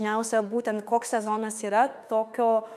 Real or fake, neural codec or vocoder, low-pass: fake; autoencoder, 48 kHz, 32 numbers a frame, DAC-VAE, trained on Japanese speech; 14.4 kHz